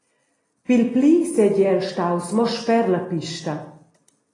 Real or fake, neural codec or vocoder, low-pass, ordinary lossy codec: real; none; 10.8 kHz; AAC, 32 kbps